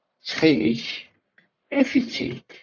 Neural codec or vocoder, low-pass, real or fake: codec, 44.1 kHz, 1.7 kbps, Pupu-Codec; 7.2 kHz; fake